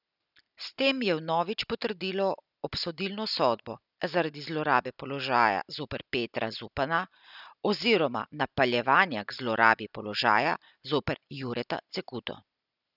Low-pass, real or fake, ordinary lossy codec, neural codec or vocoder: 5.4 kHz; real; none; none